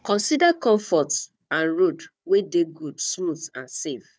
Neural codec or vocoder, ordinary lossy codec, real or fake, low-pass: codec, 16 kHz, 4 kbps, FunCodec, trained on Chinese and English, 50 frames a second; none; fake; none